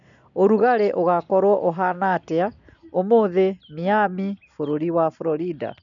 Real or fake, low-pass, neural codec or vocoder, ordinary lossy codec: real; 7.2 kHz; none; none